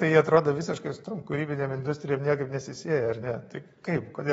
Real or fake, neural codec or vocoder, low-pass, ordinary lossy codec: fake; codec, 24 kHz, 3.1 kbps, DualCodec; 10.8 kHz; AAC, 24 kbps